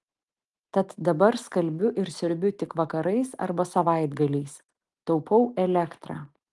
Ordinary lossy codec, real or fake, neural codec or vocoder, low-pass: Opus, 24 kbps; real; none; 10.8 kHz